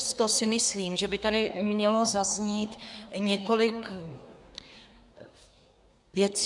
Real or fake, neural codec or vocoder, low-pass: fake; codec, 24 kHz, 1 kbps, SNAC; 10.8 kHz